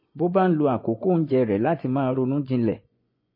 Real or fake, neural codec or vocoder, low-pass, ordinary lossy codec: fake; vocoder, 24 kHz, 100 mel bands, Vocos; 5.4 kHz; MP3, 24 kbps